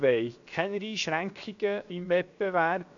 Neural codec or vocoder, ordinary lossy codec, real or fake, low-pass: codec, 16 kHz, 0.7 kbps, FocalCodec; none; fake; 7.2 kHz